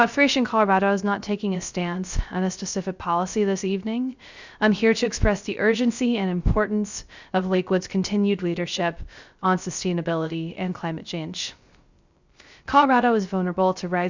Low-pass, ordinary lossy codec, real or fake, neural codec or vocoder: 7.2 kHz; Opus, 64 kbps; fake; codec, 16 kHz, 0.3 kbps, FocalCodec